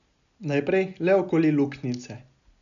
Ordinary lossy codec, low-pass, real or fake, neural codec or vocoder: none; 7.2 kHz; real; none